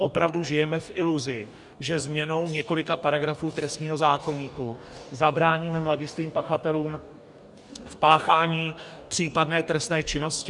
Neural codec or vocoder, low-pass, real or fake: codec, 44.1 kHz, 2.6 kbps, DAC; 10.8 kHz; fake